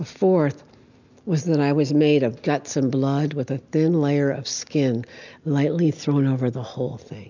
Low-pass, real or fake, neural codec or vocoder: 7.2 kHz; real; none